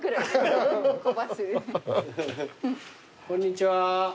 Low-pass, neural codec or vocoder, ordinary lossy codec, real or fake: none; none; none; real